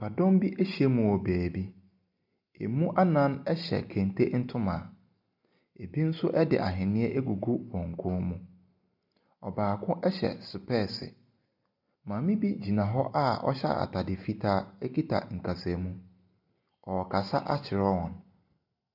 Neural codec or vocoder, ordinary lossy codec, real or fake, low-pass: none; MP3, 48 kbps; real; 5.4 kHz